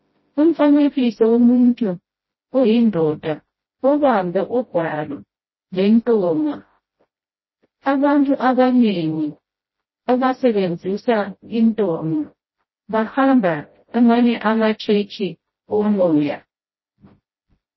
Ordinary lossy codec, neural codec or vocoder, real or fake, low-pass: MP3, 24 kbps; codec, 16 kHz, 0.5 kbps, FreqCodec, smaller model; fake; 7.2 kHz